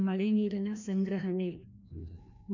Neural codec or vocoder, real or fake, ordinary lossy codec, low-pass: codec, 16 kHz, 1 kbps, FreqCodec, larger model; fake; none; 7.2 kHz